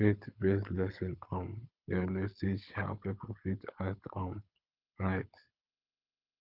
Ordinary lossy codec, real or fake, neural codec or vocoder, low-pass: Opus, 24 kbps; fake; codec, 24 kHz, 6 kbps, HILCodec; 5.4 kHz